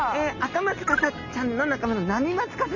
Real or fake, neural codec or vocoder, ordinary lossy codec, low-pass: real; none; MP3, 64 kbps; 7.2 kHz